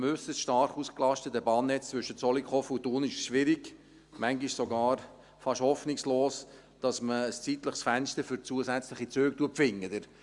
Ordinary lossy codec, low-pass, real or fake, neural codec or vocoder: Opus, 64 kbps; 10.8 kHz; real; none